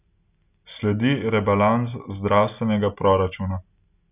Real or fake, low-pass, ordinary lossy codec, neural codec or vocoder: real; 3.6 kHz; none; none